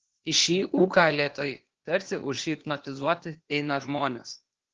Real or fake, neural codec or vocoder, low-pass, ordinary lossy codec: fake; codec, 16 kHz, 0.8 kbps, ZipCodec; 7.2 kHz; Opus, 16 kbps